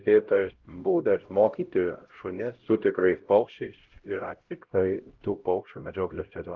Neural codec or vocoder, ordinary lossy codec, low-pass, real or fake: codec, 16 kHz, 0.5 kbps, X-Codec, HuBERT features, trained on LibriSpeech; Opus, 16 kbps; 7.2 kHz; fake